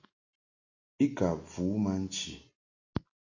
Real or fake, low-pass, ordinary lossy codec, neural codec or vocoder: real; 7.2 kHz; AAC, 48 kbps; none